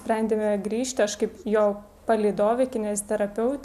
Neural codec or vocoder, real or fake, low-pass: none; real; 14.4 kHz